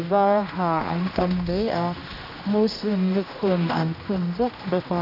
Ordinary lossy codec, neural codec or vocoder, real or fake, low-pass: none; codec, 16 kHz, 1 kbps, X-Codec, HuBERT features, trained on general audio; fake; 5.4 kHz